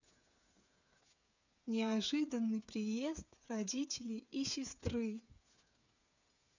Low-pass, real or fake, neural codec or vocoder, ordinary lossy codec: 7.2 kHz; fake; codec, 16 kHz, 8 kbps, FreqCodec, smaller model; none